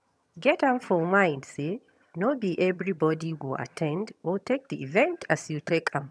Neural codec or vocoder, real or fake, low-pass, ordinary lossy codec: vocoder, 22.05 kHz, 80 mel bands, HiFi-GAN; fake; none; none